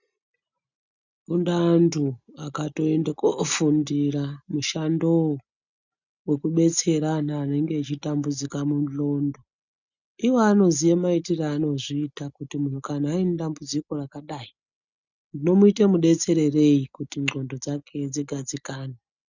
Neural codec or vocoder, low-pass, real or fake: none; 7.2 kHz; real